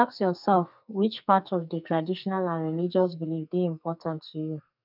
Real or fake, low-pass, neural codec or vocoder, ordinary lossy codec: fake; 5.4 kHz; codec, 44.1 kHz, 2.6 kbps, SNAC; none